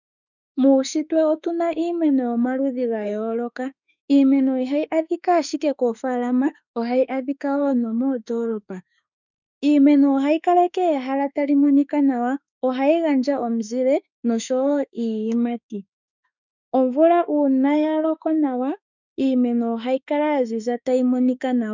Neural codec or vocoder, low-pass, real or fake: autoencoder, 48 kHz, 32 numbers a frame, DAC-VAE, trained on Japanese speech; 7.2 kHz; fake